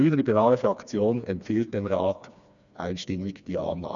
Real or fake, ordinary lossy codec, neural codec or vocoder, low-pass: fake; none; codec, 16 kHz, 2 kbps, FreqCodec, smaller model; 7.2 kHz